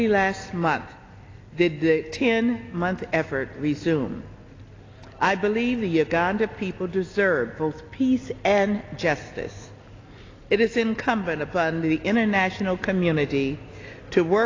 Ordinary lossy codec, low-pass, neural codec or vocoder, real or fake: AAC, 32 kbps; 7.2 kHz; none; real